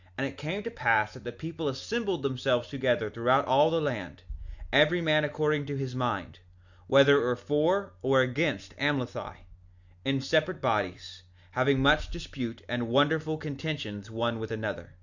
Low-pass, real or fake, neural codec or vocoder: 7.2 kHz; real; none